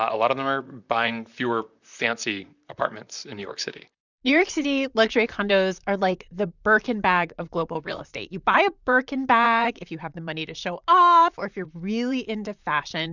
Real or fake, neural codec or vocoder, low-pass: fake; vocoder, 44.1 kHz, 128 mel bands, Pupu-Vocoder; 7.2 kHz